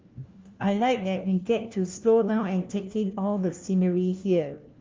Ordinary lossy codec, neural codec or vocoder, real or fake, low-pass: Opus, 32 kbps; codec, 16 kHz, 1 kbps, FunCodec, trained on LibriTTS, 50 frames a second; fake; 7.2 kHz